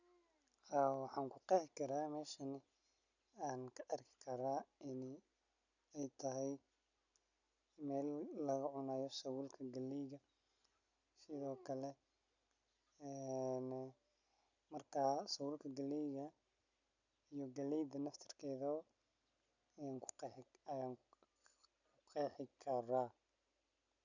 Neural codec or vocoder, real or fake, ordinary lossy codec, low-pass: none; real; none; 7.2 kHz